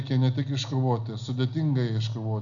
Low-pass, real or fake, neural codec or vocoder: 7.2 kHz; real; none